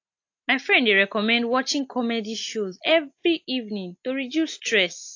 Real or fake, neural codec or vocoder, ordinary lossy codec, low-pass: real; none; AAC, 48 kbps; 7.2 kHz